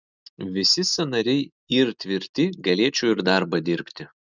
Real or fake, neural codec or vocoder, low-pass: real; none; 7.2 kHz